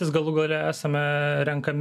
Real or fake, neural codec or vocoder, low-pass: real; none; 14.4 kHz